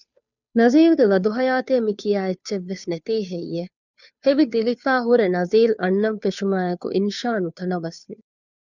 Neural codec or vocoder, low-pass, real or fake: codec, 16 kHz, 2 kbps, FunCodec, trained on Chinese and English, 25 frames a second; 7.2 kHz; fake